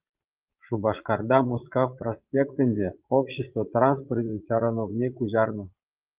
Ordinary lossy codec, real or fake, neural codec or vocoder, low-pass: Opus, 32 kbps; fake; vocoder, 22.05 kHz, 80 mel bands, Vocos; 3.6 kHz